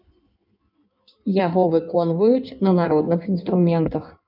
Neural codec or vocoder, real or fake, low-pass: codec, 16 kHz in and 24 kHz out, 1.1 kbps, FireRedTTS-2 codec; fake; 5.4 kHz